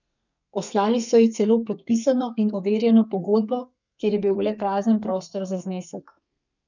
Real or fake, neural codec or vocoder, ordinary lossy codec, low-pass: fake; codec, 32 kHz, 1.9 kbps, SNAC; none; 7.2 kHz